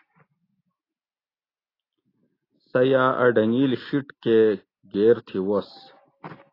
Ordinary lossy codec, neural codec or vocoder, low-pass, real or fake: AAC, 24 kbps; none; 5.4 kHz; real